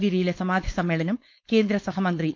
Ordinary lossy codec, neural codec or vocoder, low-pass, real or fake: none; codec, 16 kHz, 4.8 kbps, FACodec; none; fake